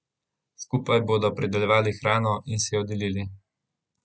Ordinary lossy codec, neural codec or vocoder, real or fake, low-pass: none; none; real; none